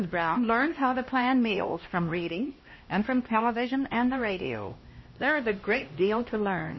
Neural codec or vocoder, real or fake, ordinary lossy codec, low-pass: codec, 16 kHz, 1 kbps, X-Codec, HuBERT features, trained on LibriSpeech; fake; MP3, 24 kbps; 7.2 kHz